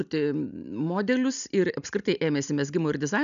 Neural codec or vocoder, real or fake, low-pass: none; real; 7.2 kHz